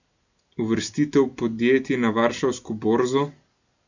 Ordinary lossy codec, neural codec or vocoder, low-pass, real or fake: none; none; 7.2 kHz; real